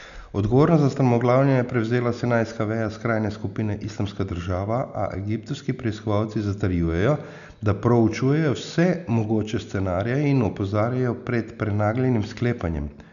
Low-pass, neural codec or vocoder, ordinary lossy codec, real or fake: 7.2 kHz; none; none; real